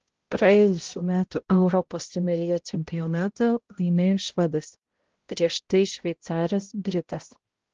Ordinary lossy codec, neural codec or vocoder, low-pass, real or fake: Opus, 16 kbps; codec, 16 kHz, 0.5 kbps, X-Codec, HuBERT features, trained on balanced general audio; 7.2 kHz; fake